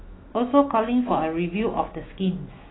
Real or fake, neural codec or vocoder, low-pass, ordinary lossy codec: fake; autoencoder, 48 kHz, 128 numbers a frame, DAC-VAE, trained on Japanese speech; 7.2 kHz; AAC, 16 kbps